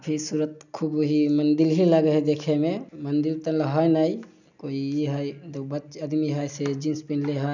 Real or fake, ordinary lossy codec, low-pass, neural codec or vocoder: real; none; 7.2 kHz; none